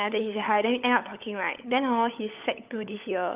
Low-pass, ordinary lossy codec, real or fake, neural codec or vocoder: 3.6 kHz; Opus, 24 kbps; fake; codec, 16 kHz, 16 kbps, FunCodec, trained on LibriTTS, 50 frames a second